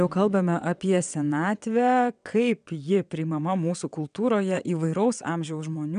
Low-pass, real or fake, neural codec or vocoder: 9.9 kHz; fake; vocoder, 22.05 kHz, 80 mel bands, WaveNeXt